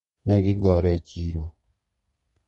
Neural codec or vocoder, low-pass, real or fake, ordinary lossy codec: codec, 32 kHz, 1.9 kbps, SNAC; 14.4 kHz; fake; MP3, 48 kbps